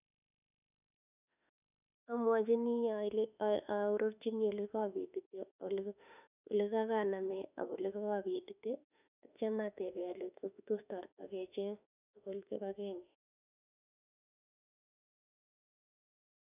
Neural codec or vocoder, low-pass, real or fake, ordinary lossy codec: autoencoder, 48 kHz, 32 numbers a frame, DAC-VAE, trained on Japanese speech; 3.6 kHz; fake; none